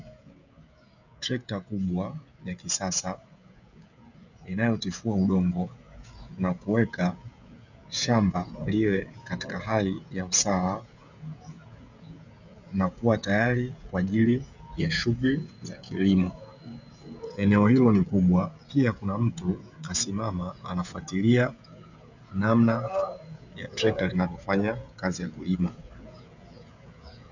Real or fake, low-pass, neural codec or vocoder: fake; 7.2 kHz; codec, 16 kHz, 16 kbps, FreqCodec, smaller model